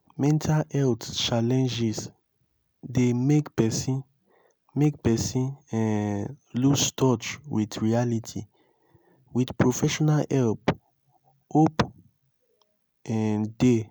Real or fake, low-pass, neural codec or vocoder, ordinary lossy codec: real; none; none; none